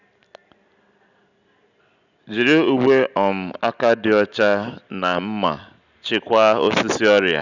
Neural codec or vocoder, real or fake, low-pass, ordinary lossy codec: none; real; 7.2 kHz; none